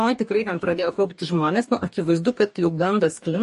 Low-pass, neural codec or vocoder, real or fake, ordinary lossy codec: 14.4 kHz; codec, 44.1 kHz, 2.6 kbps, DAC; fake; MP3, 48 kbps